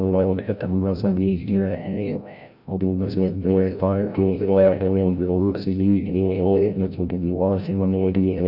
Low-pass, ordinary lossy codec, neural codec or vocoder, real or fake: 5.4 kHz; none; codec, 16 kHz, 0.5 kbps, FreqCodec, larger model; fake